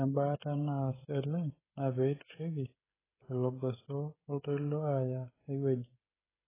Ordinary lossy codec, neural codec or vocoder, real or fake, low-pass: AAC, 16 kbps; codec, 16 kHz, 16 kbps, FreqCodec, smaller model; fake; 3.6 kHz